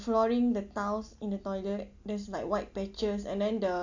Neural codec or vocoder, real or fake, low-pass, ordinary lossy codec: none; real; 7.2 kHz; AAC, 48 kbps